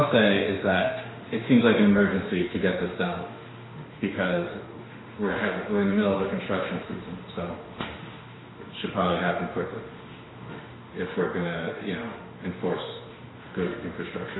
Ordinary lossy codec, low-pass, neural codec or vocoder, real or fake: AAC, 16 kbps; 7.2 kHz; autoencoder, 48 kHz, 32 numbers a frame, DAC-VAE, trained on Japanese speech; fake